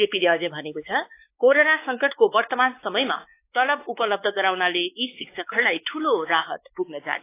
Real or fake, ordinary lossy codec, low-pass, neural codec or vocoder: fake; AAC, 24 kbps; 3.6 kHz; codec, 16 kHz, 4 kbps, X-Codec, WavLM features, trained on Multilingual LibriSpeech